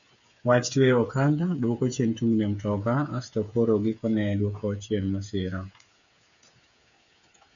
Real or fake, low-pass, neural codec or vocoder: fake; 7.2 kHz; codec, 16 kHz, 8 kbps, FreqCodec, smaller model